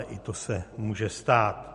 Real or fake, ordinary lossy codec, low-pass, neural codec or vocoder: real; MP3, 48 kbps; 10.8 kHz; none